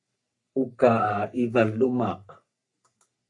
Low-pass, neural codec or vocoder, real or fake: 10.8 kHz; codec, 44.1 kHz, 3.4 kbps, Pupu-Codec; fake